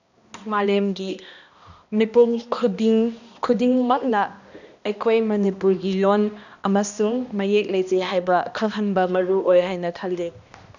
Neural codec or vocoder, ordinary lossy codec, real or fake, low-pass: codec, 16 kHz, 1 kbps, X-Codec, HuBERT features, trained on balanced general audio; none; fake; 7.2 kHz